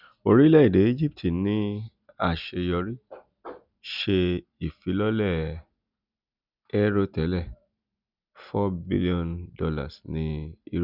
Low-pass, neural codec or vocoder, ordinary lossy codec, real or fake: 5.4 kHz; none; none; real